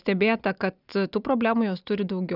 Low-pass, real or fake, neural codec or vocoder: 5.4 kHz; real; none